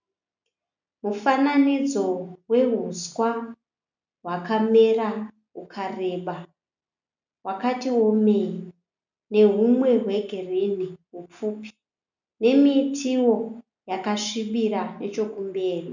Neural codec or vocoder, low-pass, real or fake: none; 7.2 kHz; real